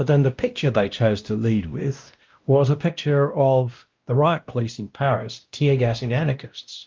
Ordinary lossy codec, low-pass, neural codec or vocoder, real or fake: Opus, 32 kbps; 7.2 kHz; codec, 16 kHz, 1 kbps, X-Codec, WavLM features, trained on Multilingual LibriSpeech; fake